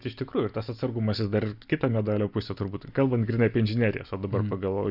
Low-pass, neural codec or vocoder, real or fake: 5.4 kHz; none; real